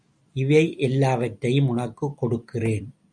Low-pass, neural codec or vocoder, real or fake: 9.9 kHz; none; real